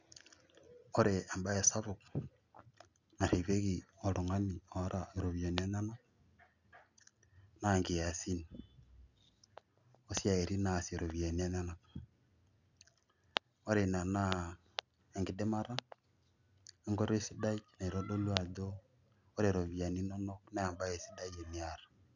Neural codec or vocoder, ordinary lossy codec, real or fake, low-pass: none; none; real; 7.2 kHz